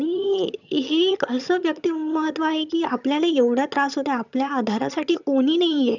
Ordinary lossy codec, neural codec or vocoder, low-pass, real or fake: none; vocoder, 22.05 kHz, 80 mel bands, HiFi-GAN; 7.2 kHz; fake